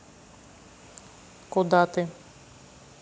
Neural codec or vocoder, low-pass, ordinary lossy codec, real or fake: none; none; none; real